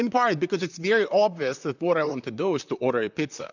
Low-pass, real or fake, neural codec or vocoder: 7.2 kHz; fake; vocoder, 44.1 kHz, 128 mel bands, Pupu-Vocoder